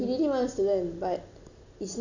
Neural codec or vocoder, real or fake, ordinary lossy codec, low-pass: none; real; none; 7.2 kHz